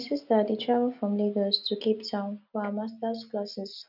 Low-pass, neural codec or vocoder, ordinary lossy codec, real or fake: 5.4 kHz; none; none; real